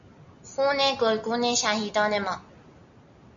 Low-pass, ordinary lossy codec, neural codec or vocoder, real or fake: 7.2 kHz; AAC, 64 kbps; none; real